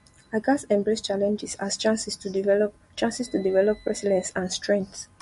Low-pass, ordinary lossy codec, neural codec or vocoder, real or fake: 14.4 kHz; MP3, 48 kbps; none; real